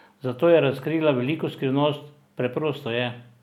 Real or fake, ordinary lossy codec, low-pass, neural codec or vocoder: real; none; 19.8 kHz; none